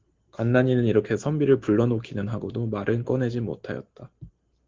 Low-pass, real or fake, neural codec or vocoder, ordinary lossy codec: 7.2 kHz; real; none; Opus, 16 kbps